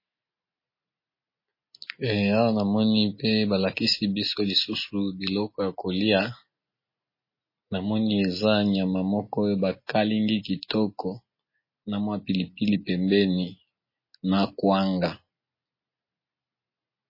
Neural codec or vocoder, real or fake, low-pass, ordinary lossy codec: none; real; 5.4 kHz; MP3, 24 kbps